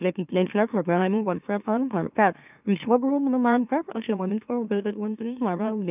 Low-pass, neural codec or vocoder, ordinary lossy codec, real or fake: 3.6 kHz; autoencoder, 44.1 kHz, a latent of 192 numbers a frame, MeloTTS; none; fake